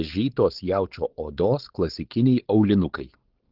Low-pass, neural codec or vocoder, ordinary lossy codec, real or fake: 5.4 kHz; codec, 16 kHz, 4.8 kbps, FACodec; Opus, 16 kbps; fake